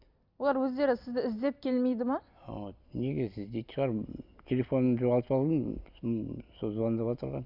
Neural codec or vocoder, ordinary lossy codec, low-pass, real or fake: none; none; 5.4 kHz; real